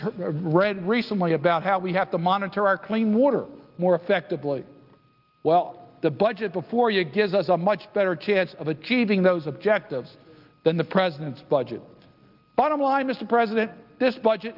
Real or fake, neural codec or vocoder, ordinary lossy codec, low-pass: real; none; Opus, 32 kbps; 5.4 kHz